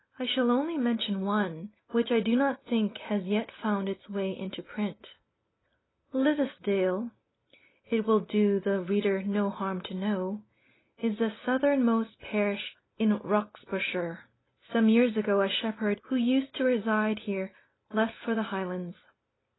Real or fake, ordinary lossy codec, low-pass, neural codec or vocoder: real; AAC, 16 kbps; 7.2 kHz; none